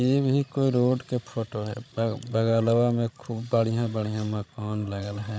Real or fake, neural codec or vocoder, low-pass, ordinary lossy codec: fake; codec, 16 kHz, 16 kbps, FreqCodec, larger model; none; none